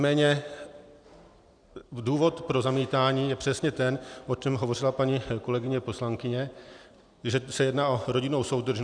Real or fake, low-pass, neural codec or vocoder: real; 9.9 kHz; none